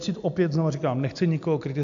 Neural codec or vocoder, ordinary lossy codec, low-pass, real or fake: none; AAC, 64 kbps; 7.2 kHz; real